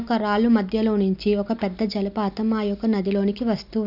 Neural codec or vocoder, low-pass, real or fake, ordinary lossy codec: none; 5.4 kHz; real; none